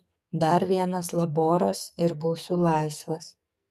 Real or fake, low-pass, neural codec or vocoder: fake; 14.4 kHz; codec, 44.1 kHz, 2.6 kbps, SNAC